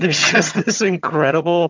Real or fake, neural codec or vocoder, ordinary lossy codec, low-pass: fake; vocoder, 22.05 kHz, 80 mel bands, HiFi-GAN; MP3, 64 kbps; 7.2 kHz